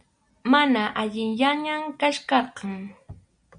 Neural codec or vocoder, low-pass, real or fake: none; 9.9 kHz; real